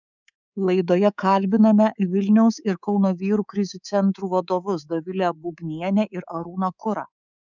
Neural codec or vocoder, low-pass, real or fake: codec, 24 kHz, 3.1 kbps, DualCodec; 7.2 kHz; fake